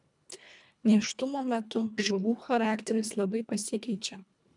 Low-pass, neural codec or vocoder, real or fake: 10.8 kHz; codec, 24 kHz, 1.5 kbps, HILCodec; fake